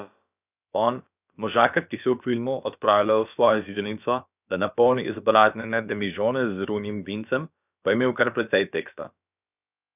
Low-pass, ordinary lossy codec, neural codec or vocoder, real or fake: 3.6 kHz; none; codec, 16 kHz, about 1 kbps, DyCAST, with the encoder's durations; fake